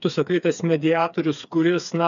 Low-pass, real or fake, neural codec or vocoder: 7.2 kHz; fake; codec, 16 kHz, 4 kbps, FreqCodec, smaller model